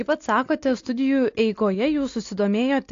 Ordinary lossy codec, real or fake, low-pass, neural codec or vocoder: AAC, 48 kbps; real; 7.2 kHz; none